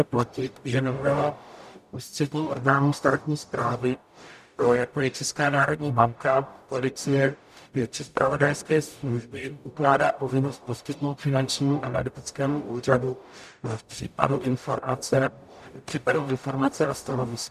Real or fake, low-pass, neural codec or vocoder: fake; 14.4 kHz; codec, 44.1 kHz, 0.9 kbps, DAC